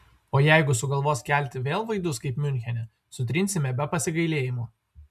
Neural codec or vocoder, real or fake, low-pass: none; real; 14.4 kHz